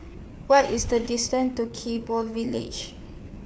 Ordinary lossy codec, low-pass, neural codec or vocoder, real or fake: none; none; codec, 16 kHz, 4 kbps, FreqCodec, larger model; fake